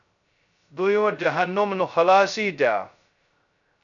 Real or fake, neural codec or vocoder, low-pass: fake; codec, 16 kHz, 0.2 kbps, FocalCodec; 7.2 kHz